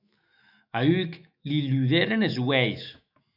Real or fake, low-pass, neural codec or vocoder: fake; 5.4 kHz; autoencoder, 48 kHz, 128 numbers a frame, DAC-VAE, trained on Japanese speech